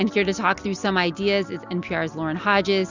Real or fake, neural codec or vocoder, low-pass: real; none; 7.2 kHz